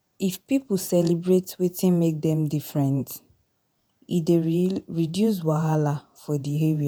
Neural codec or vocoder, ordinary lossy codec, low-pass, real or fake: vocoder, 48 kHz, 128 mel bands, Vocos; none; none; fake